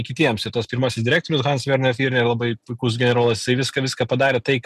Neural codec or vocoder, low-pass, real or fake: none; 14.4 kHz; real